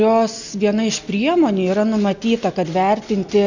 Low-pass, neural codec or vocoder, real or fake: 7.2 kHz; none; real